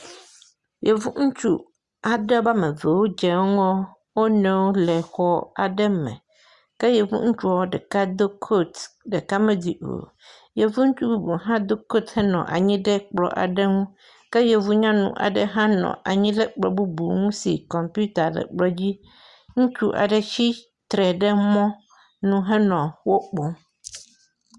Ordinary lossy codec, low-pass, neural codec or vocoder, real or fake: Opus, 64 kbps; 10.8 kHz; none; real